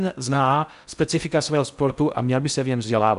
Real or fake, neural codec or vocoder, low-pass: fake; codec, 16 kHz in and 24 kHz out, 0.6 kbps, FocalCodec, streaming, 4096 codes; 10.8 kHz